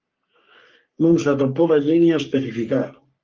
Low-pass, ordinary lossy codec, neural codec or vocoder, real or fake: 7.2 kHz; Opus, 24 kbps; codec, 44.1 kHz, 3.4 kbps, Pupu-Codec; fake